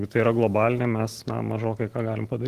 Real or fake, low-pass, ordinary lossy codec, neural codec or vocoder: real; 14.4 kHz; Opus, 16 kbps; none